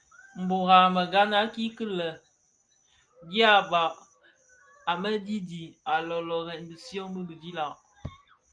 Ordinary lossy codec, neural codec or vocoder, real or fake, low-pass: Opus, 32 kbps; none; real; 9.9 kHz